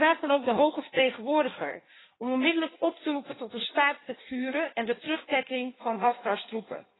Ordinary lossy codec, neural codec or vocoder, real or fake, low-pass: AAC, 16 kbps; codec, 16 kHz in and 24 kHz out, 1.1 kbps, FireRedTTS-2 codec; fake; 7.2 kHz